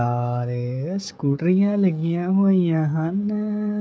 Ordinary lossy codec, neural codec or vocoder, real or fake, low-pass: none; codec, 16 kHz, 16 kbps, FreqCodec, smaller model; fake; none